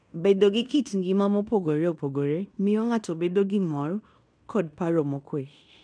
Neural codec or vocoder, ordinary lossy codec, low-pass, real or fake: codec, 16 kHz in and 24 kHz out, 0.9 kbps, LongCat-Audio-Codec, fine tuned four codebook decoder; none; 9.9 kHz; fake